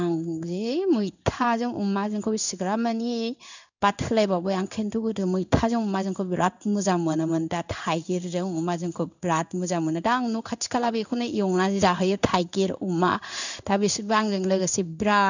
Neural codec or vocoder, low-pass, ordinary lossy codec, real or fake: codec, 16 kHz in and 24 kHz out, 1 kbps, XY-Tokenizer; 7.2 kHz; none; fake